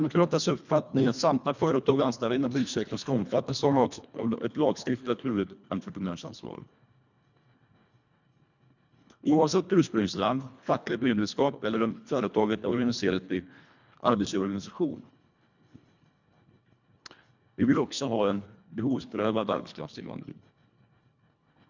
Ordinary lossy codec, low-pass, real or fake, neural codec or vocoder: none; 7.2 kHz; fake; codec, 24 kHz, 1.5 kbps, HILCodec